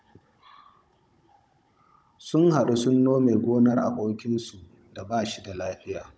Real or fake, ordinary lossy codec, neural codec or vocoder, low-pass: fake; none; codec, 16 kHz, 16 kbps, FunCodec, trained on Chinese and English, 50 frames a second; none